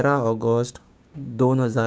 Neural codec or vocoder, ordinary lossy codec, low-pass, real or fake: codec, 16 kHz, 6 kbps, DAC; none; none; fake